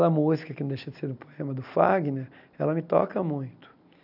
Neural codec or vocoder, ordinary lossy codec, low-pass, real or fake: none; none; 5.4 kHz; real